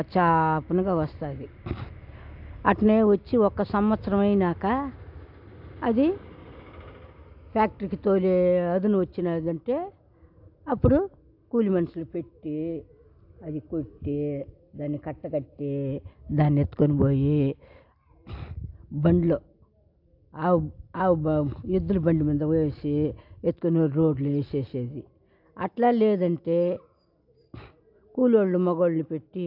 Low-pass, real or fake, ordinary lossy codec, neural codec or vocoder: 5.4 kHz; real; AAC, 48 kbps; none